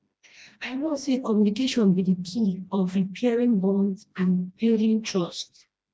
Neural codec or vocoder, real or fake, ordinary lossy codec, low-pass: codec, 16 kHz, 1 kbps, FreqCodec, smaller model; fake; none; none